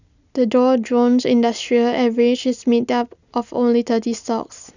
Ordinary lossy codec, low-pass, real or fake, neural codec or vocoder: none; 7.2 kHz; real; none